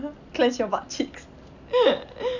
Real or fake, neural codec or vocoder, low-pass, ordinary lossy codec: real; none; 7.2 kHz; none